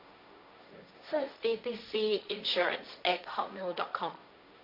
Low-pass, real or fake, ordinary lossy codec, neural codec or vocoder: 5.4 kHz; fake; MP3, 48 kbps; codec, 16 kHz, 1.1 kbps, Voila-Tokenizer